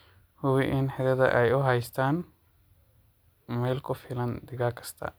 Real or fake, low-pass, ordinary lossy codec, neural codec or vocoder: real; none; none; none